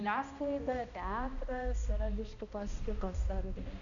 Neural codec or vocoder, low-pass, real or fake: codec, 16 kHz, 1 kbps, X-Codec, HuBERT features, trained on balanced general audio; 7.2 kHz; fake